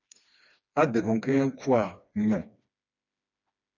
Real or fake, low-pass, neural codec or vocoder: fake; 7.2 kHz; codec, 16 kHz, 2 kbps, FreqCodec, smaller model